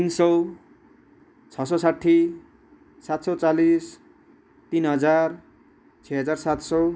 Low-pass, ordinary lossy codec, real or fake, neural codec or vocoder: none; none; real; none